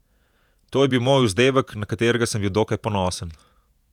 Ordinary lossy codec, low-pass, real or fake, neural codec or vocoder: none; 19.8 kHz; fake; vocoder, 48 kHz, 128 mel bands, Vocos